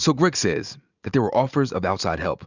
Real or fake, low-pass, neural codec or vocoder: real; 7.2 kHz; none